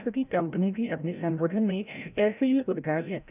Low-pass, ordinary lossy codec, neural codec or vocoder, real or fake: 3.6 kHz; none; codec, 16 kHz, 0.5 kbps, FreqCodec, larger model; fake